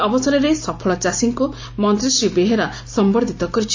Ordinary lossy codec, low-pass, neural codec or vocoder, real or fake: AAC, 48 kbps; 7.2 kHz; none; real